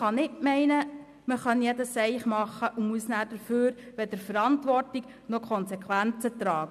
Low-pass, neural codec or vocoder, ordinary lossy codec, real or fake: 14.4 kHz; none; none; real